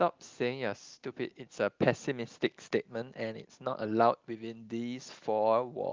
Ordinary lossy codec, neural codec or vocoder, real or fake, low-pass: Opus, 32 kbps; none; real; 7.2 kHz